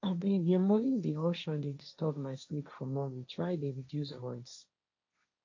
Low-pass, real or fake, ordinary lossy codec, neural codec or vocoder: 7.2 kHz; fake; AAC, 48 kbps; codec, 16 kHz, 1.1 kbps, Voila-Tokenizer